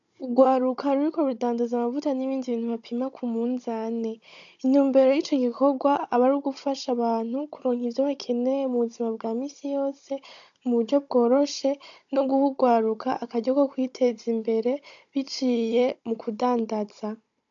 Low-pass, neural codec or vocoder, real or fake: 7.2 kHz; codec, 16 kHz, 16 kbps, FunCodec, trained on Chinese and English, 50 frames a second; fake